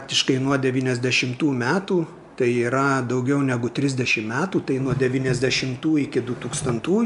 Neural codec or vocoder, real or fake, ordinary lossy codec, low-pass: none; real; AAC, 96 kbps; 10.8 kHz